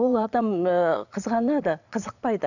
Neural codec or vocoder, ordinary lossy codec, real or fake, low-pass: vocoder, 22.05 kHz, 80 mel bands, Vocos; none; fake; 7.2 kHz